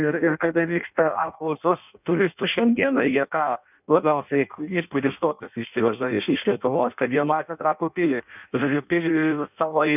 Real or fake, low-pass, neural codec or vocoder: fake; 3.6 kHz; codec, 16 kHz in and 24 kHz out, 0.6 kbps, FireRedTTS-2 codec